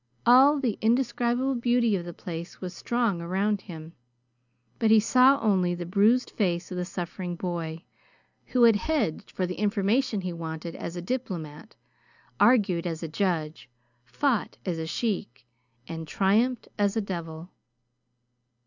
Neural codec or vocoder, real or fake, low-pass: none; real; 7.2 kHz